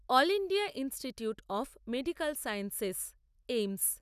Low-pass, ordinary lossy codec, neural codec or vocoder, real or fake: 14.4 kHz; none; none; real